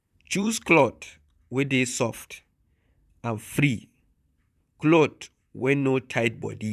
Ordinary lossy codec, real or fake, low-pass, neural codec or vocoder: none; fake; 14.4 kHz; vocoder, 44.1 kHz, 128 mel bands, Pupu-Vocoder